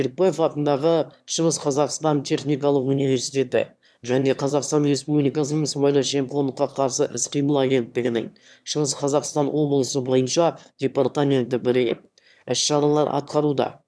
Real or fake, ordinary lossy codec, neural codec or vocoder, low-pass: fake; none; autoencoder, 22.05 kHz, a latent of 192 numbers a frame, VITS, trained on one speaker; none